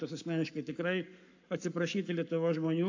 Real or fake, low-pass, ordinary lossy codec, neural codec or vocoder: fake; 7.2 kHz; AAC, 48 kbps; codec, 44.1 kHz, 7.8 kbps, Pupu-Codec